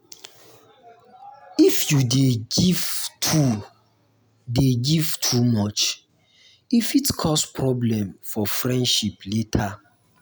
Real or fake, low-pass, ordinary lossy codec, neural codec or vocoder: real; none; none; none